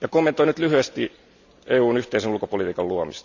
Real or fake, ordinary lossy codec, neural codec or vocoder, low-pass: real; none; none; 7.2 kHz